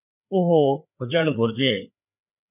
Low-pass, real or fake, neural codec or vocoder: 3.6 kHz; fake; codec, 16 kHz, 4 kbps, FreqCodec, larger model